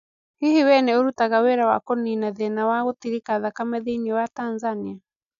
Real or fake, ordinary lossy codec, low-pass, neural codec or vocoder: real; none; 7.2 kHz; none